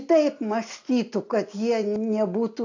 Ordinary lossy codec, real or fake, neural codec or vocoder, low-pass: AAC, 48 kbps; real; none; 7.2 kHz